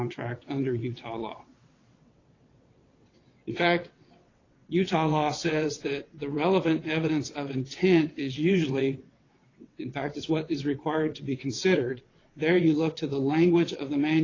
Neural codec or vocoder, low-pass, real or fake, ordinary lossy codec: vocoder, 22.05 kHz, 80 mel bands, WaveNeXt; 7.2 kHz; fake; AAC, 32 kbps